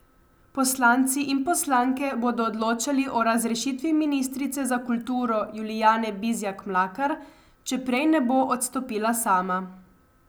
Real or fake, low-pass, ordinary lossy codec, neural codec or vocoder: real; none; none; none